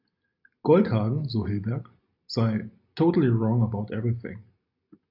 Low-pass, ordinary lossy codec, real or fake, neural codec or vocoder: 5.4 kHz; AAC, 48 kbps; real; none